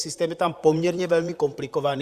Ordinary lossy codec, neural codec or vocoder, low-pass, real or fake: Opus, 64 kbps; vocoder, 44.1 kHz, 128 mel bands, Pupu-Vocoder; 14.4 kHz; fake